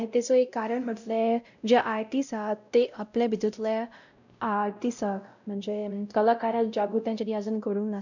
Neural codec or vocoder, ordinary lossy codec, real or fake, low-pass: codec, 16 kHz, 0.5 kbps, X-Codec, WavLM features, trained on Multilingual LibriSpeech; none; fake; 7.2 kHz